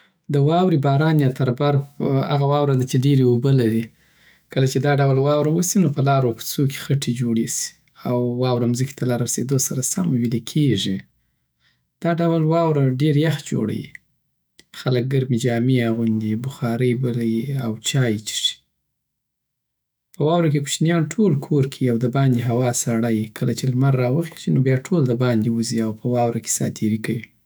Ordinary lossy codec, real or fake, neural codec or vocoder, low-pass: none; fake; autoencoder, 48 kHz, 128 numbers a frame, DAC-VAE, trained on Japanese speech; none